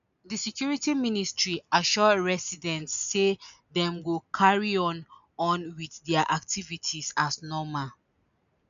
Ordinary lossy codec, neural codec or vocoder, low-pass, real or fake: none; none; 7.2 kHz; real